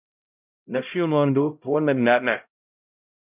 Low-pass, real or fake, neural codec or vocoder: 3.6 kHz; fake; codec, 16 kHz, 0.5 kbps, X-Codec, HuBERT features, trained on LibriSpeech